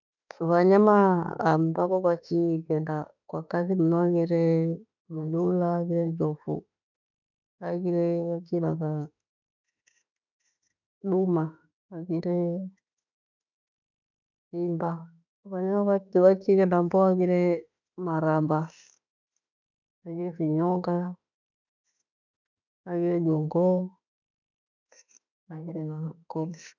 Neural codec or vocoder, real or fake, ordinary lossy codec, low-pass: autoencoder, 48 kHz, 32 numbers a frame, DAC-VAE, trained on Japanese speech; fake; none; 7.2 kHz